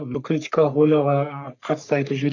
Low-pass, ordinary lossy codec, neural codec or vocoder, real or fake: 7.2 kHz; none; codec, 44.1 kHz, 3.4 kbps, Pupu-Codec; fake